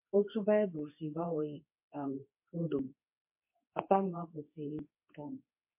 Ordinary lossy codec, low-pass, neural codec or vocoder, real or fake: MP3, 32 kbps; 3.6 kHz; codec, 24 kHz, 0.9 kbps, WavTokenizer, medium speech release version 2; fake